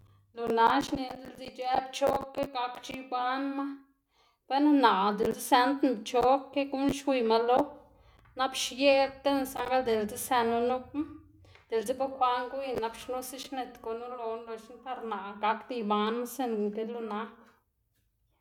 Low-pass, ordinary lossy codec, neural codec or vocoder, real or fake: 19.8 kHz; none; none; real